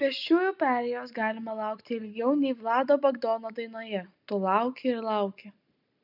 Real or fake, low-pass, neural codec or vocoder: real; 5.4 kHz; none